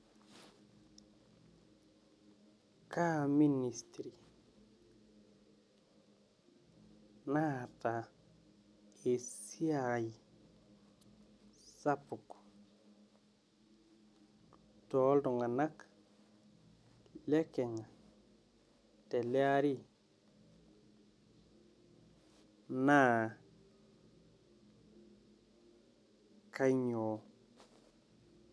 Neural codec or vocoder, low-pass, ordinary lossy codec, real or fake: none; none; none; real